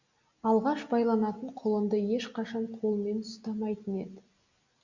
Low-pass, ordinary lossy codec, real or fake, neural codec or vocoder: 7.2 kHz; Opus, 64 kbps; real; none